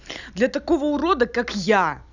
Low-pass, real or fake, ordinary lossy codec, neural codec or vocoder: 7.2 kHz; real; none; none